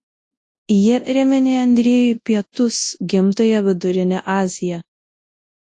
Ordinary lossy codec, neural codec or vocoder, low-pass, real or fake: AAC, 48 kbps; codec, 24 kHz, 0.9 kbps, WavTokenizer, large speech release; 10.8 kHz; fake